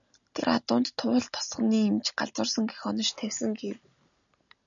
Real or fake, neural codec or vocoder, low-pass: real; none; 7.2 kHz